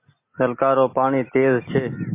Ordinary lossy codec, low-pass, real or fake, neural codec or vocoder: MP3, 24 kbps; 3.6 kHz; real; none